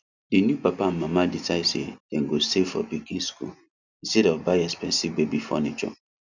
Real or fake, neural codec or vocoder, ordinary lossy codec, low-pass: real; none; none; 7.2 kHz